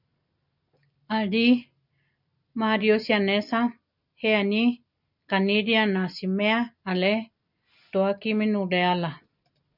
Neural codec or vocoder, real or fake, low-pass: none; real; 5.4 kHz